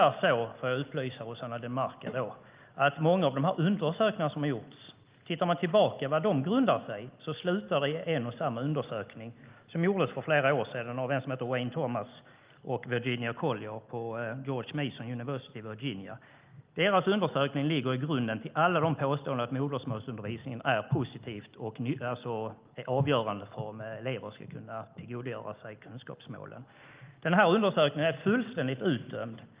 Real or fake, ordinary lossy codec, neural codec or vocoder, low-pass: real; Opus, 32 kbps; none; 3.6 kHz